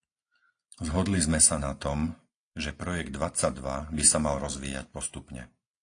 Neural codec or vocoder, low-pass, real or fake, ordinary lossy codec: none; 9.9 kHz; real; AAC, 48 kbps